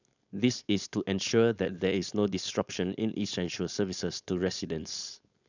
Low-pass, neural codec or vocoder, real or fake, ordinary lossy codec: 7.2 kHz; codec, 16 kHz, 4.8 kbps, FACodec; fake; none